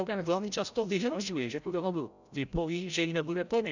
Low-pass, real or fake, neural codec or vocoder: 7.2 kHz; fake; codec, 16 kHz, 0.5 kbps, FreqCodec, larger model